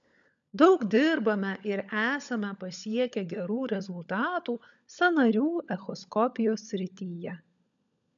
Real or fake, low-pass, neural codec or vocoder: fake; 7.2 kHz; codec, 16 kHz, 16 kbps, FunCodec, trained on LibriTTS, 50 frames a second